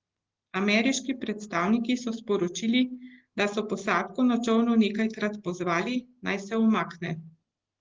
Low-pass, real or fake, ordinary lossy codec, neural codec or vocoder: 7.2 kHz; real; Opus, 16 kbps; none